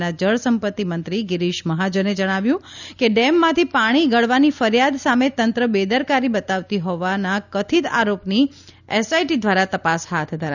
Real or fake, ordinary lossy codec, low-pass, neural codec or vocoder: real; none; 7.2 kHz; none